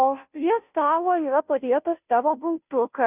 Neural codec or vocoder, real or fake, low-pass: codec, 16 kHz, 0.5 kbps, FunCodec, trained on Chinese and English, 25 frames a second; fake; 3.6 kHz